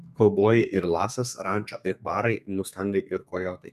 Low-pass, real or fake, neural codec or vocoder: 14.4 kHz; fake; codec, 32 kHz, 1.9 kbps, SNAC